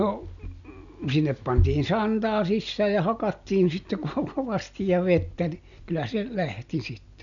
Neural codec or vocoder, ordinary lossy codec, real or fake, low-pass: none; none; real; 7.2 kHz